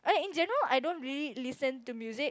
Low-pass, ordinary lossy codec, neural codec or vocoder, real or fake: none; none; none; real